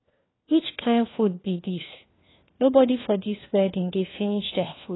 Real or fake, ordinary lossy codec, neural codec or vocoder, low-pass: fake; AAC, 16 kbps; codec, 16 kHz, 1 kbps, FunCodec, trained on LibriTTS, 50 frames a second; 7.2 kHz